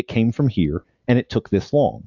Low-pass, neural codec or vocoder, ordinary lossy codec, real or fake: 7.2 kHz; none; AAC, 48 kbps; real